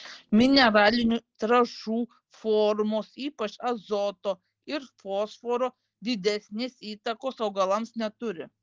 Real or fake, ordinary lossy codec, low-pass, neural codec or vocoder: real; Opus, 16 kbps; 7.2 kHz; none